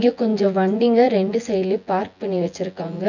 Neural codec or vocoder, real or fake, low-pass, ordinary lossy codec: vocoder, 24 kHz, 100 mel bands, Vocos; fake; 7.2 kHz; none